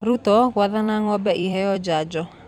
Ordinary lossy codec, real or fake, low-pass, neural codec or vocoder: none; fake; 19.8 kHz; vocoder, 44.1 kHz, 128 mel bands every 256 samples, BigVGAN v2